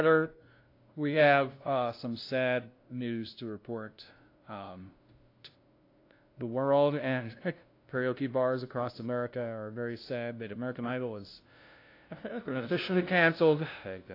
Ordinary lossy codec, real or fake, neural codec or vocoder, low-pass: AAC, 32 kbps; fake; codec, 16 kHz, 0.5 kbps, FunCodec, trained on LibriTTS, 25 frames a second; 5.4 kHz